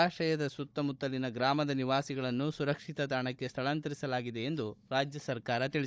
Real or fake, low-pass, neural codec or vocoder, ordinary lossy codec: fake; none; codec, 16 kHz, 16 kbps, FunCodec, trained on Chinese and English, 50 frames a second; none